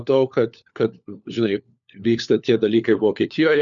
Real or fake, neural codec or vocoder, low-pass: fake; codec, 16 kHz, 4 kbps, FunCodec, trained on LibriTTS, 50 frames a second; 7.2 kHz